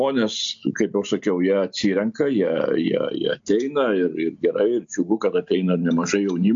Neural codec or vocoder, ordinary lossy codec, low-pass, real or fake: none; AAC, 64 kbps; 7.2 kHz; real